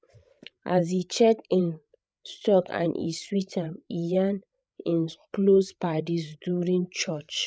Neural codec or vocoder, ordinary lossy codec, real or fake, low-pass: codec, 16 kHz, 8 kbps, FreqCodec, larger model; none; fake; none